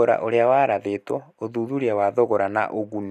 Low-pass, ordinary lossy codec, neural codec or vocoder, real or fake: 14.4 kHz; none; none; real